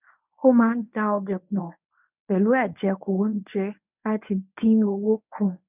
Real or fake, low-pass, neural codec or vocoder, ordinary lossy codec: fake; 3.6 kHz; codec, 24 kHz, 0.9 kbps, WavTokenizer, medium speech release version 1; none